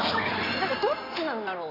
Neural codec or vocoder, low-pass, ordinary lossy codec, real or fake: codec, 16 kHz in and 24 kHz out, 2.2 kbps, FireRedTTS-2 codec; 5.4 kHz; none; fake